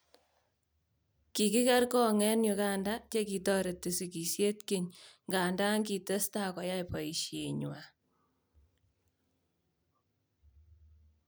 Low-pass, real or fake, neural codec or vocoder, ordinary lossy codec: none; real; none; none